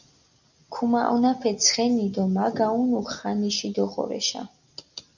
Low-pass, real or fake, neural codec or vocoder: 7.2 kHz; real; none